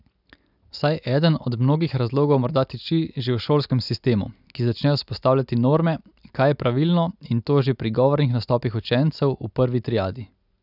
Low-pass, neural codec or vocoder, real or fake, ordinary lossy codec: 5.4 kHz; none; real; none